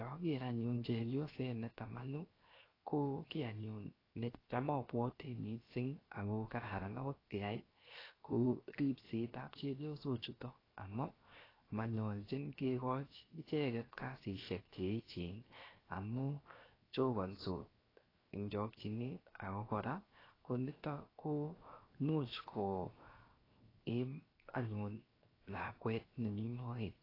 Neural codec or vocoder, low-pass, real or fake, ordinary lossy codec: codec, 16 kHz, 0.7 kbps, FocalCodec; 5.4 kHz; fake; AAC, 24 kbps